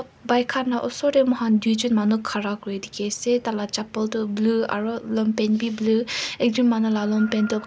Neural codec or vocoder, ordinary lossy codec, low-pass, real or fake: none; none; none; real